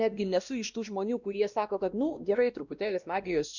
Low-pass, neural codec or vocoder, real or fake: 7.2 kHz; codec, 16 kHz, 1 kbps, X-Codec, WavLM features, trained on Multilingual LibriSpeech; fake